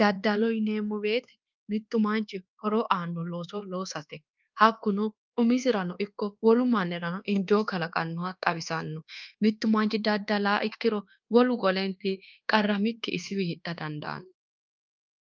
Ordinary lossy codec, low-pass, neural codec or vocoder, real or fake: Opus, 24 kbps; 7.2 kHz; codec, 24 kHz, 1.2 kbps, DualCodec; fake